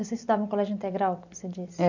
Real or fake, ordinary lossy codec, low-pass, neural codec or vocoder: real; none; 7.2 kHz; none